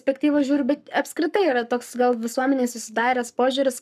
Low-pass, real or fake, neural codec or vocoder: 14.4 kHz; fake; codec, 44.1 kHz, 7.8 kbps, Pupu-Codec